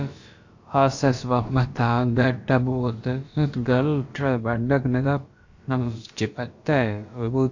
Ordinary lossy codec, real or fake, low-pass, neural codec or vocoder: AAC, 48 kbps; fake; 7.2 kHz; codec, 16 kHz, about 1 kbps, DyCAST, with the encoder's durations